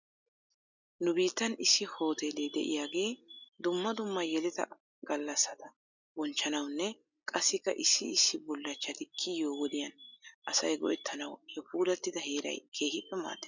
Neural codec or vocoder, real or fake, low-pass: none; real; 7.2 kHz